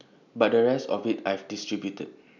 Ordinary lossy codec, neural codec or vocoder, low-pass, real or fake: none; none; 7.2 kHz; real